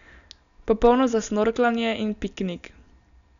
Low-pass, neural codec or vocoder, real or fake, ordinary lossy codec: 7.2 kHz; none; real; none